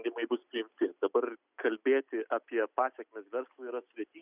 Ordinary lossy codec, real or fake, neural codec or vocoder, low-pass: Opus, 64 kbps; real; none; 3.6 kHz